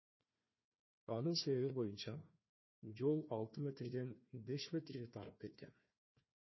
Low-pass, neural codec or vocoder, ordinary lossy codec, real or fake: 7.2 kHz; codec, 16 kHz, 1 kbps, FunCodec, trained on Chinese and English, 50 frames a second; MP3, 24 kbps; fake